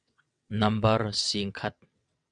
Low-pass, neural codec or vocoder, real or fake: 9.9 kHz; vocoder, 22.05 kHz, 80 mel bands, WaveNeXt; fake